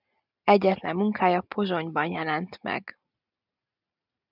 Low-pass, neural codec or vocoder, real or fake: 5.4 kHz; none; real